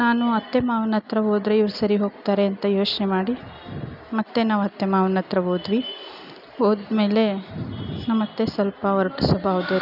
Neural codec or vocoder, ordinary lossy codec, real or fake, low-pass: none; none; real; 5.4 kHz